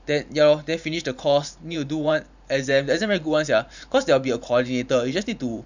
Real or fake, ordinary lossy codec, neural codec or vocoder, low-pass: fake; none; vocoder, 44.1 kHz, 128 mel bands every 512 samples, BigVGAN v2; 7.2 kHz